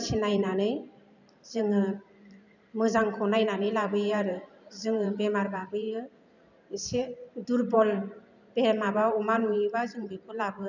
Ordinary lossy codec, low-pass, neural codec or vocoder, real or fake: none; 7.2 kHz; vocoder, 44.1 kHz, 128 mel bands every 512 samples, BigVGAN v2; fake